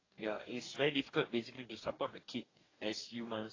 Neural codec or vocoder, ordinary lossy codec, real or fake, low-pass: codec, 44.1 kHz, 2.6 kbps, DAC; AAC, 32 kbps; fake; 7.2 kHz